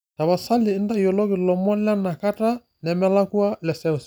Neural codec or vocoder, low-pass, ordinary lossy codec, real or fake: none; none; none; real